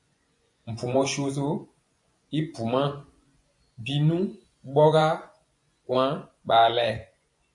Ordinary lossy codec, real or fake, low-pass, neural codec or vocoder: AAC, 64 kbps; fake; 10.8 kHz; vocoder, 24 kHz, 100 mel bands, Vocos